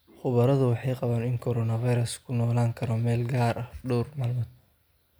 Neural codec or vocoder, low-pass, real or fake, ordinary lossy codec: none; none; real; none